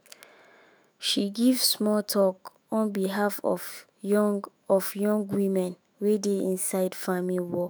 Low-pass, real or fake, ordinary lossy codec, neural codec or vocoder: none; fake; none; autoencoder, 48 kHz, 128 numbers a frame, DAC-VAE, trained on Japanese speech